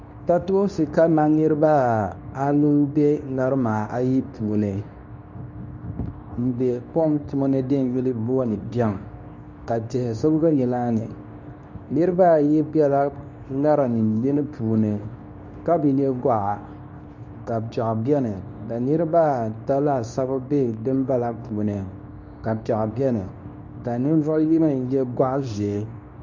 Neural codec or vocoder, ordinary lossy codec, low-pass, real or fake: codec, 24 kHz, 0.9 kbps, WavTokenizer, medium speech release version 2; MP3, 64 kbps; 7.2 kHz; fake